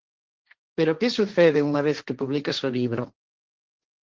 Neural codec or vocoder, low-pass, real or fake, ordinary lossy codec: codec, 16 kHz, 1.1 kbps, Voila-Tokenizer; 7.2 kHz; fake; Opus, 16 kbps